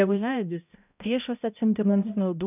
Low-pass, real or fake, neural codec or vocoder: 3.6 kHz; fake; codec, 16 kHz, 0.5 kbps, X-Codec, HuBERT features, trained on balanced general audio